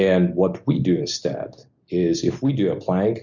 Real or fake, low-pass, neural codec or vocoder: real; 7.2 kHz; none